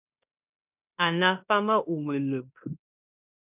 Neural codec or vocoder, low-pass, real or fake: codec, 16 kHz in and 24 kHz out, 0.9 kbps, LongCat-Audio-Codec, fine tuned four codebook decoder; 3.6 kHz; fake